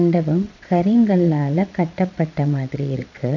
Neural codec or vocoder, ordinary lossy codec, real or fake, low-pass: vocoder, 22.05 kHz, 80 mel bands, Vocos; none; fake; 7.2 kHz